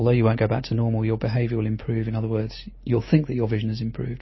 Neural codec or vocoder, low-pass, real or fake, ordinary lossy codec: none; 7.2 kHz; real; MP3, 24 kbps